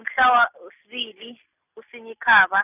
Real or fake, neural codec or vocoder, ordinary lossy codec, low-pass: real; none; none; 3.6 kHz